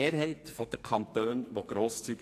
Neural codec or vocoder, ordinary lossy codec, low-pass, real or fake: codec, 44.1 kHz, 2.6 kbps, SNAC; AAC, 64 kbps; 14.4 kHz; fake